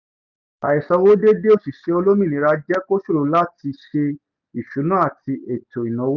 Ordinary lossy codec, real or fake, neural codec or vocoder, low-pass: none; real; none; 7.2 kHz